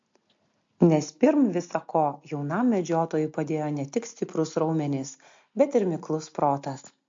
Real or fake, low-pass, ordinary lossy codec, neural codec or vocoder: real; 7.2 kHz; MP3, 48 kbps; none